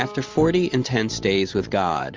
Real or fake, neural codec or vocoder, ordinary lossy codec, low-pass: real; none; Opus, 32 kbps; 7.2 kHz